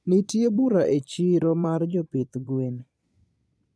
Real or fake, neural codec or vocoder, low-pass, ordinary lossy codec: fake; vocoder, 22.05 kHz, 80 mel bands, Vocos; none; none